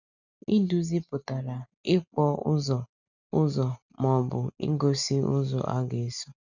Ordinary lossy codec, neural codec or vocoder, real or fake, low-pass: none; none; real; 7.2 kHz